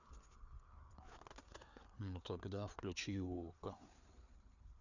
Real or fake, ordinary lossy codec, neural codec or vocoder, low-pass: fake; none; codec, 16 kHz, 4 kbps, FreqCodec, larger model; 7.2 kHz